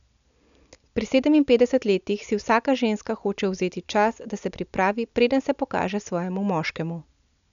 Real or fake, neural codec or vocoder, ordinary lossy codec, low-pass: real; none; none; 7.2 kHz